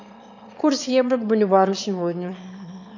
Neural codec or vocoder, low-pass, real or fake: autoencoder, 22.05 kHz, a latent of 192 numbers a frame, VITS, trained on one speaker; 7.2 kHz; fake